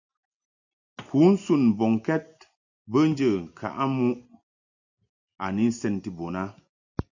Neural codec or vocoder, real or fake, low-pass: none; real; 7.2 kHz